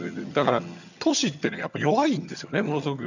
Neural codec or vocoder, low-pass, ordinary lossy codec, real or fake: vocoder, 22.05 kHz, 80 mel bands, HiFi-GAN; 7.2 kHz; none; fake